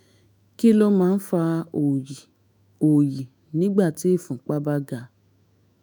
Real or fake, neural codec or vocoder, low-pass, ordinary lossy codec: fake; autoencoder, 48 kHz, 128 numbers a frame, DAC-VAE, trained on Japanese speech; none; none